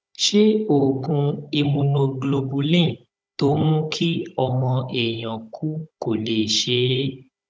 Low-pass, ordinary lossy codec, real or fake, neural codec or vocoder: none; none; fake; codec, 16 kHz, 16 kbps, FunCodec, trained on Chinese and English, 50 frames a second